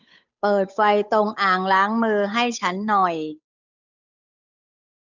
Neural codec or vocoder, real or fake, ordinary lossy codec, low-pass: codec, 16 kHz, 8 kbps, FunCodec, trained on Chinese and English, 25 frames a second; fake; none; 7.2 kHz